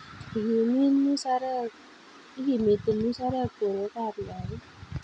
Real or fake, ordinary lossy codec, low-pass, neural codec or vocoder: real; none; 9.9 kHz; none